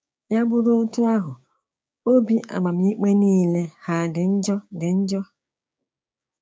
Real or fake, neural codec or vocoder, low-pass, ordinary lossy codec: fake; codec, 16 kHz, 6 kbps, DAC; none; none